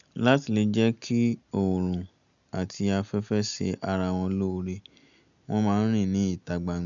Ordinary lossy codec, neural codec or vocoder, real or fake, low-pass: none; none; real; 7.2 kHz